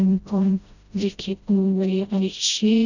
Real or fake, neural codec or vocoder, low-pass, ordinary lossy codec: fake; codec, 16 kHz, 0.5 kbps, FreqCodec, smaller model; 7.2 kHz; none